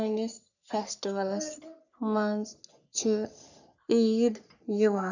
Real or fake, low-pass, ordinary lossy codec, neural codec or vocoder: fake; 7.2 kHz; none; codec, 44.1 kHz, 3.4 kbps, Pupu-Codec